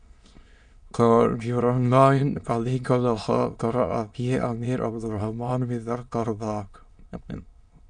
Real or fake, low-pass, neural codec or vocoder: fake; 9.9 kHz; autoencoder, 22.05 kHz, a latent of 192 numbers a frame, VITS, trained on many speakers